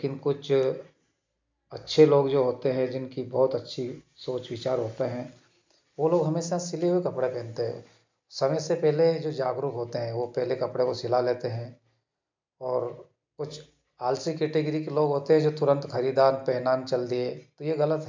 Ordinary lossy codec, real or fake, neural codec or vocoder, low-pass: MP3, 64 kbps; real; none; 7.2 kHz